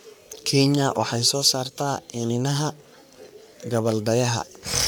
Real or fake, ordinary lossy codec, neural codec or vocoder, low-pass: fake; none; codec, 44.1 kHz, 3.4 kbps, Pupu-Codec; none